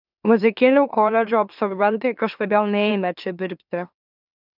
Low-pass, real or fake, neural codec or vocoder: 5.4 kHz; fake; autoencoder, 44.1 kHz, a latent of 192 numbers a frame, MeloTTS